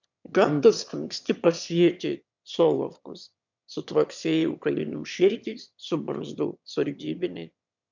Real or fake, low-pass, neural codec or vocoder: fake; 7.2 kHz; autoencoder, 22.05 kHz, a latent of 192 numbers a frame, VITS, trained on one speaker